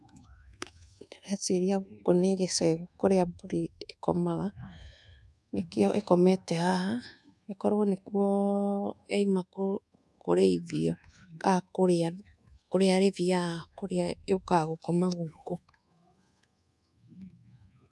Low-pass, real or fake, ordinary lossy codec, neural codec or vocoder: none; fake; none; codec, 24 kHz, 1.2 kbps, DualCodec